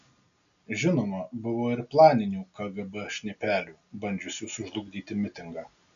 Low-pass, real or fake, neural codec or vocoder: 7.2 kHz; real; none